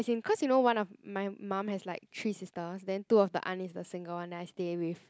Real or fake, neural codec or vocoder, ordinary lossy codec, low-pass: real; none; none; none